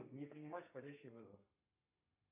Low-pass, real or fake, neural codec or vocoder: 3.6 kHz; fake; codec, 32 kHz, 1.9 kbps, SNAC